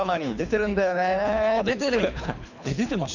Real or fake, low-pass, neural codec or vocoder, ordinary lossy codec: fake; 7.2 kHz; codec, 24 kHz, 3 kbps, HILCodec; none